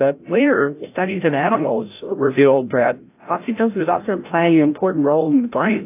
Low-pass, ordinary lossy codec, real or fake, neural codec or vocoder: 3.6 kHz; AAC, 24 kbps; fake; codec, 16 kHz, 0.5 kbps, FreqCodec, larger model